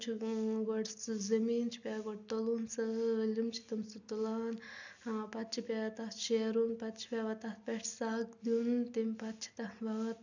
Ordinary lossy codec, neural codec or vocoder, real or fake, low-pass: none; none; real; 7.2 kHz